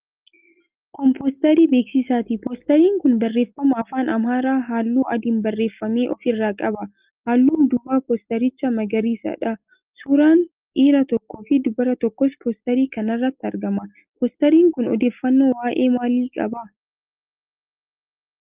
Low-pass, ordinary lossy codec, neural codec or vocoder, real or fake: 3.6 kHz; Opus, 32 kbps; none; real